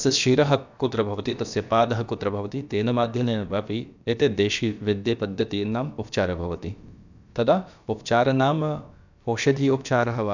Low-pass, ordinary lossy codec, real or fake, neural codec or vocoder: 7.2 kHz; none; fake; codec, 16 kHz, about 1 kbps, DyCAST, with the encoder's durations